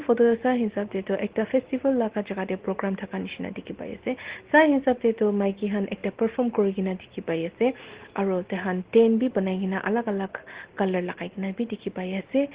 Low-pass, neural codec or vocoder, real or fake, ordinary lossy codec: 3.6 kHz; none; real; Opus, 16 kbps